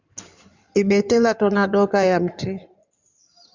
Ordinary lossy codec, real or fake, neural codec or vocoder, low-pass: Opus, 64 kbps; fake; codec, 16 kHz in and 24 kHz out, 2.2 kbps, FireRedTTS-2 codec; 7.2 kHz